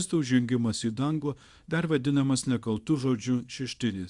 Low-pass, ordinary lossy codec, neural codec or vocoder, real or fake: 10.8 kHz; Opus, 64 kbps; codec, 24 kHz, 0.9 kbps, WavTokenizer, small release; fake